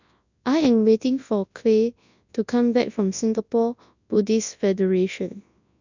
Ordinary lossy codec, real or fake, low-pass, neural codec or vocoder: none; fake; 7.2 kHz; codec, 24 kHz, 0.9 kbps, WavTokenizer, large speech release